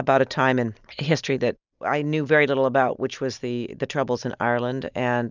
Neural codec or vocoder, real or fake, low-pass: none; real; 7.2 kHz